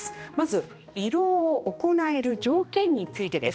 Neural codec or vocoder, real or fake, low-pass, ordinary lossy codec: codec, 16 kHz, 1 kbps, X-Codec, HuBERT features, trained on balanced general audio; fake; none; none